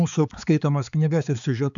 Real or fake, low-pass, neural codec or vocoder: fake; 7.2 kHz; codec, 16 kHz, 4 kbps, X-Codec, HuBERT features, trained on balanced general audio